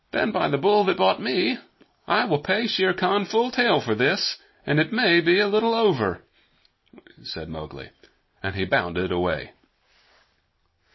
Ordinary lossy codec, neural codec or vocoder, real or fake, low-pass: MP3, 24 kbps; none; real; 7.2 kHz